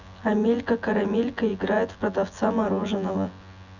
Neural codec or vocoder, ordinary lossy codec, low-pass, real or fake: vocoder, 24 kHz, 100 mel bands, Vocos; none; 7.2 kHz; fake